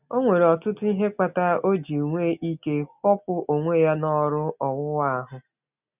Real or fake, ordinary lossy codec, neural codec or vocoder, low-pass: real; none; none; 3.6 kHz